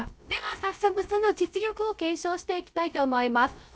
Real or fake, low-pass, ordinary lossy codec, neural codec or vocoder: fake; none; none; codec, 16 kHz, 0.3 kbps, FocalCodec